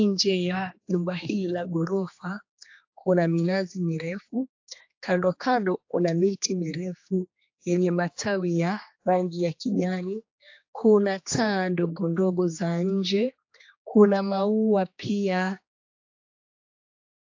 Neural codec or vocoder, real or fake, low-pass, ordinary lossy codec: codec, 16 kHz, 2 kbps, X-Codec, HuBERT features, trained on general audio; fake; 7.2 kHz; AAC, 48 kbps